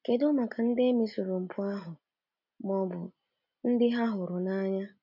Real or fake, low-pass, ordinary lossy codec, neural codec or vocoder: real; 5.4 kHz; none; none